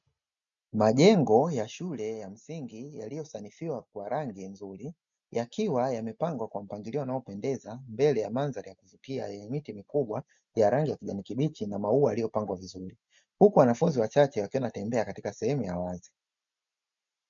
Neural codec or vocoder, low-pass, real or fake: none; 7.2 kHz; real